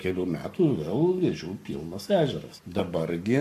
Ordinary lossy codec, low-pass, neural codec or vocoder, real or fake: MP3, 96 kbps; 14.4 kHz; codec, 44.1 kHz, 7.8 kbps, Pupu-Codec; fake